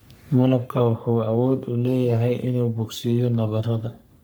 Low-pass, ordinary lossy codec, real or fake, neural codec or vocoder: none; none; fake; codec, 44.1 kHz, 3.4 kbps, Pupu-Codec